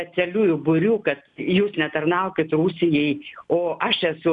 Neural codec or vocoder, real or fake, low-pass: none; real; 10.8 kHz